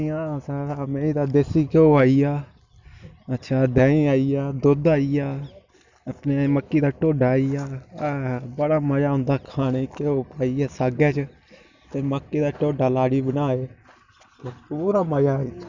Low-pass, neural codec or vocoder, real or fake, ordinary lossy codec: 7.2 kHz; none; real; Opus, 64 kbps